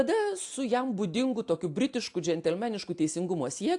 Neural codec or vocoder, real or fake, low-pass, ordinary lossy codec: none; real; 10.8 kHz; AAC, 64 kbps